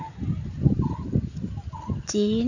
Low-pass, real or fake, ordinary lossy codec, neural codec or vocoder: 7.2 kHz; real; none; none